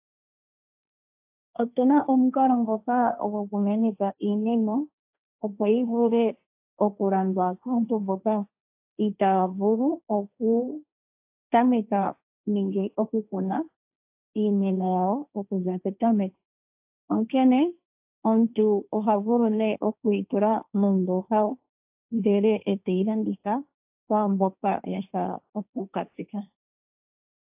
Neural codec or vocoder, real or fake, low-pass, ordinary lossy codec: codec, 16 kHz, 1.1 kbps, Voila-Tokenizer; fake; 3.6 kHz; AAC, 32 kbps